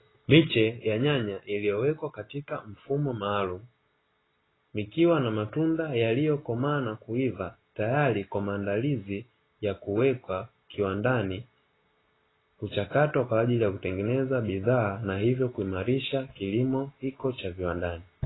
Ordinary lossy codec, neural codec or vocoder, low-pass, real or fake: AAC, 16 kbps; none; 7.2 kHz; real